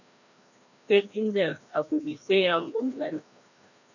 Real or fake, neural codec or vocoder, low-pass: fake; codec, 16 kHz, 1 kbps, FreqCodec, larger model; 7.2 kHz